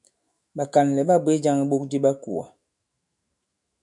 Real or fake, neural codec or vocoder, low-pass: fake; autoencoder, 48 kHz, 128 numbers a frame, DAC-VAE, trained on Japanese speech; 10.8 kHz